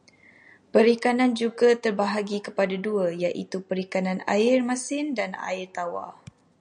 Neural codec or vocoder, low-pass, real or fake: none; 9.9 kHz; real